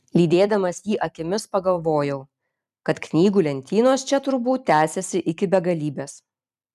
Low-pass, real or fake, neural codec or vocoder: 14.4 kHz; fake; vocoder, 44.1 kHz, 128 mel bands every 256 samples, BigVGAN v2